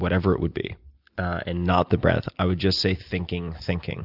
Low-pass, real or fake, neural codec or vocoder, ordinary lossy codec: 5.4 kHz; real; none; Opus, 64 kbps